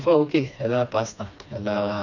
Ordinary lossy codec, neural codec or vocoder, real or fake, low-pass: none; codec, 16 kHz, 2 kbps, FreqCodec, smaller model; fake; 7.2 kHz